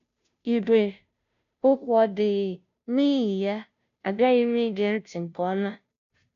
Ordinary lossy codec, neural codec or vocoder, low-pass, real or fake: none; codec, 16 kHz, 0.5 kbps, FunCodec, trained on Chinese and English, 25 frames a second; 7.2 kHz; fake